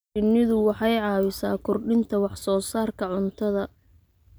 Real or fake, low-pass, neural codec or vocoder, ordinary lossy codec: real; none; none; none